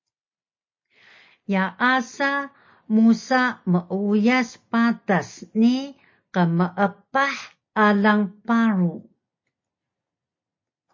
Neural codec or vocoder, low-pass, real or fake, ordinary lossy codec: none; 7.2 kHz; real; MP3, 32 kbps